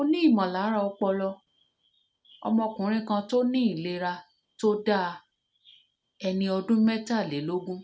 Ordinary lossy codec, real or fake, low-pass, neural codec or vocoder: none; real; none; none